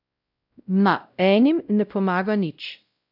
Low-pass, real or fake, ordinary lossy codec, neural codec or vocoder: 5.4 kHz; fake; AAC, 48 kbps; codec, 16 kHz, 0.5 kbps, X-Codec, WavLM features, trained on Multilingual LibriSpeech